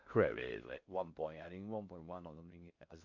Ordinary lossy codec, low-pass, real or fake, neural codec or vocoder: MP3, 48 kbps; 7.2 kHz; fake; codec, 16 kHz in and 24 kHz out, 0.6 kbps, FocalCodec, streaming, 4096 codes